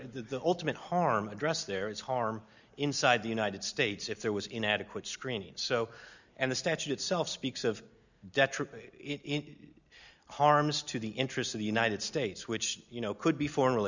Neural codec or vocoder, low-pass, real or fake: none; 7.2 kHz; real